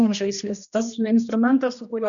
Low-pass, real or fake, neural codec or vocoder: 7.2 kHz; fake; codec, 16 kHz, 1 kbps, X-Codec, HuBERT features, trained on general audio